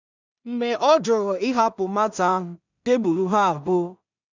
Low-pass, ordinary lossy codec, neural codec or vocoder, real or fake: 7.2 kHz; none; codec, 16 kHz in and 24 kHz out, 0.4 kbps, LongCat-Audio-Codec, two codebook decoder; fake